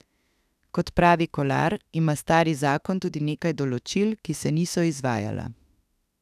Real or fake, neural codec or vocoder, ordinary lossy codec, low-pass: fake; autoencoder, 48 kHz, 32 numbers a frame, DAC-VAE, trained on Japanese speech; none; 14.4 kHz